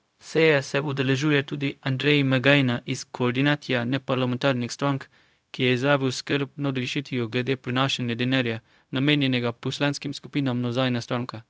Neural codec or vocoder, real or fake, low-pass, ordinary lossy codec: codec, 16 kHz, 0.4 kbps, LongCat-Audio-Codec; fake; none; none